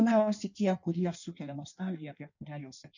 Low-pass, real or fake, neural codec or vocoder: 7.2 kHz; fake; codec, 16 kHz in and 24 kHz out, 1.1 kbps, FireRedTTS-2 codec